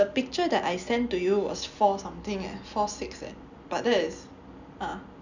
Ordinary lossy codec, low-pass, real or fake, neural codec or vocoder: none; 7.2 kHz; real; none